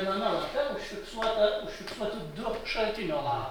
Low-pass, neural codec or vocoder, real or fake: 19.8 kHz; none; real